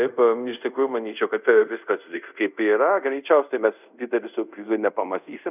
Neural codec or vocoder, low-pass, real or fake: codec, 24 kHz, 0.5 kbps, DualCodec; 3.6 kHz; fake